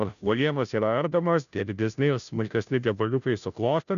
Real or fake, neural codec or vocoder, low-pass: fake; codec, 16 kHz, 0.5 kbps, FunCodec, trained on Chinese and English, 25 frames a second; 7.2 kHz